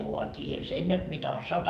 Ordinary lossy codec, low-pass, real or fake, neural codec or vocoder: none; 14.4 kHz; fake; codec, 44.1 kHz, 2.6 kbps, SNAC